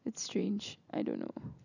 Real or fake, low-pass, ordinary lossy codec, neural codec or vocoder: real; 7.2 kHz; none; none